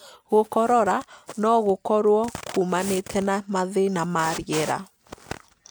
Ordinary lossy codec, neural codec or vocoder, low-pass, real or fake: none; none; none; real